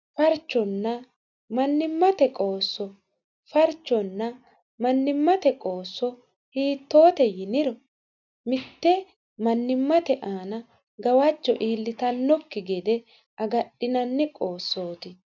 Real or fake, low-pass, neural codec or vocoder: real; 7.2 kHz; none